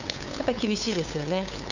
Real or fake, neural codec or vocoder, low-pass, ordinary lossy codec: fake; codec, 16 kHz, 8 kbps, FunCodec, trained on LibriTTS, 25 frames a second; 7.2 kHz; none